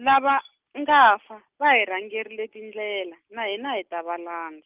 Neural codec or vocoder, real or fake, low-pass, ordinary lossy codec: none; real; 3.6 kHz; Opus, 32 kbps